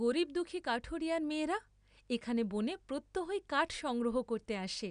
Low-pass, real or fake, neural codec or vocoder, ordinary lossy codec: 9.9 kHz; real; none; none